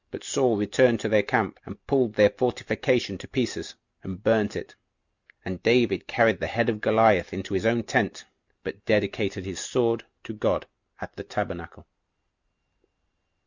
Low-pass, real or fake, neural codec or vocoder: 7.2 kHz; real; none